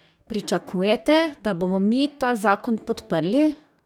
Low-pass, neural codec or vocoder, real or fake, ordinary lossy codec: 19.8 kHz; codec, 44.1 kHz, 2.6 kbps, DAC; fake; none